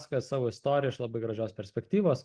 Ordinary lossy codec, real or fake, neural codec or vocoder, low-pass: Opus, 24 kbps; real; none; 9.9 kHz